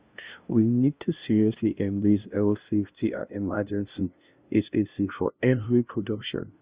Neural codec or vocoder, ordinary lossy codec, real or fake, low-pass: codec, 16 kHz, 0.5 kbps, FunCodec, trained on LibriTTS, 25 frames a second; Opus, 64 kbps; fake; 3.6 kHz